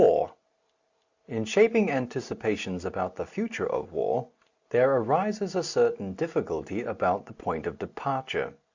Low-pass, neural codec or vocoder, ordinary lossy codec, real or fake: 7.2 kHz; none; Opus, 64 kbps; real